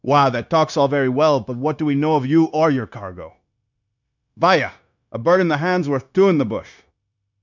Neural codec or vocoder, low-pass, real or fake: codec, 16 kHz, 0.9 kbps, LongCat-Audio-Codec; 7.2 kHz; fake